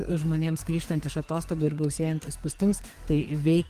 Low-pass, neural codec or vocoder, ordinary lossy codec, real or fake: 14.4 kHz; codec, 44.1 kHz, 2.6 kbps, SNAC; Opus, 24 kbps; fake